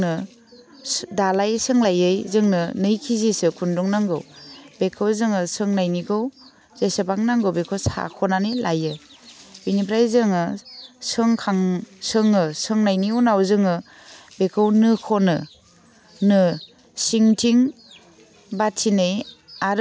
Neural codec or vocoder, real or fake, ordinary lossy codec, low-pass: none; real; none; none